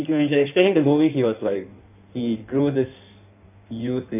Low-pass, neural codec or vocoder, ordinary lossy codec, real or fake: 3.6 kHz; codec, 16 kHz in and 24 kHz out, 1.1 kbps, FireRedTTS-2 codec; none; fake